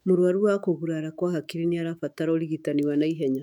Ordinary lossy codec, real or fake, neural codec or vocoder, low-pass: none; fake; autoencoder, 48 kHz, 128 numbers a frame, DAC-VAE, trained on Japanese speech; 19.8 kHz